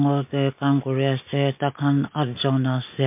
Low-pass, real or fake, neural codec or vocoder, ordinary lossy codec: 3.6 kHz; real; none; MP3, 32 kbps